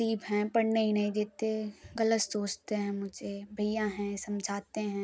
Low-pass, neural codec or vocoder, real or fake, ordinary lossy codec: none; none; real; none